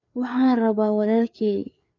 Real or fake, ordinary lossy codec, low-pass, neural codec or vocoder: fake; none; 7.2 kHz; codec, 16 kHz, 4 kbps, FreqCodec, larger model